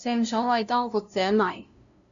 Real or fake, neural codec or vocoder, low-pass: fake; codec, 16 kHz, 0.5 kbps, FunCodec, trained on LibriTTS, 25 frames a second; 7.2 kHz